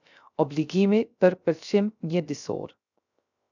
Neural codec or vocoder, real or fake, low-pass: codec, 16 kHz, 0.3 kbps, FocalCodec; fake; 7.2 kHz